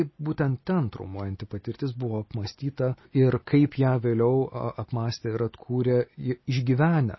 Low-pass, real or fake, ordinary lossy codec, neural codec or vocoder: 7.2 kHz; real; MP3, 24 kbps; none